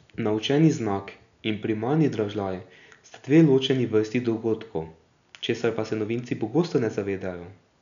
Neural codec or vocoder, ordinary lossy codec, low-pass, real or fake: none; none; 7.2 kHz; real